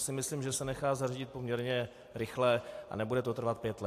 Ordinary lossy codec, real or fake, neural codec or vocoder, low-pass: AAC, 64 kbps; real; none; 14.4 kHz